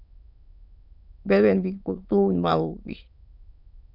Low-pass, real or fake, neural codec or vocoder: 5.4 kHz; fake; autoencoder, 22.05 kHz, a latent of 192 numbers a frame, VITS, trained on many speakers